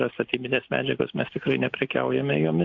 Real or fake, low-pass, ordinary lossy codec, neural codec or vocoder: real; 7.2 kHz; MP3, 64 kbps; none